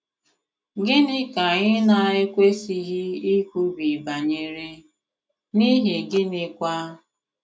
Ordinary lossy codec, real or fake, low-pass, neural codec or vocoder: none; real; none; none